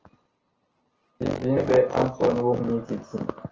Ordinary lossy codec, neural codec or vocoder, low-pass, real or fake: Opus, 16 kbps; none; 7.2 kHz; real